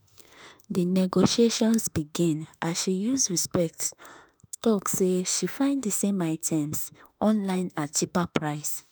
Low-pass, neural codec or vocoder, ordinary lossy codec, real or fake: none; autoencoder, 48 kHz, 32 numbers a frame, DAC-VAE, trained on Japanese speech; none; fake